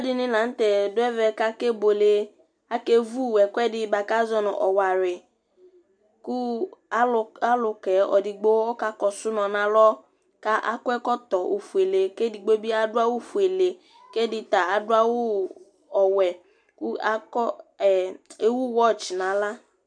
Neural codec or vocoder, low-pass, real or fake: none; 9.9 kHz; real